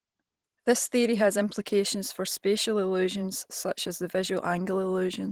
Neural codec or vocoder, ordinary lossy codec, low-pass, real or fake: vocoder, 44.1 kHz, 128 mel bands every 512 samples, BigVGAN v2; Opus, 16 kbps; 19.8 kHz; fake